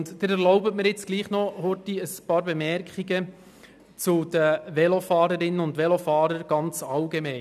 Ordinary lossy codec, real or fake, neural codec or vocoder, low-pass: none; real; none; 14.4 kHz